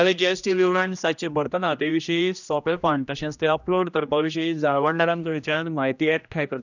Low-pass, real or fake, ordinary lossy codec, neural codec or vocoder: 7.2 kHz; fake; none; codec, 16 kHz, 1 kbps, X-Codec, HuBERT features, trained on general audio